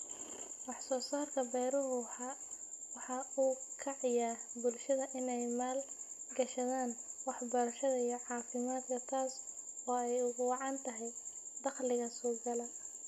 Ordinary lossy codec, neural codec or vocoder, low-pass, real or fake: none; none; 14.4 kHz; real